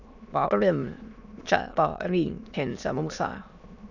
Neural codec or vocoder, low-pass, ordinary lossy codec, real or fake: autoencoder, 22.05 kHz, a latent of 192 numbers a frame, VITS, trained on many speakers; 7.2 kHz; none; fake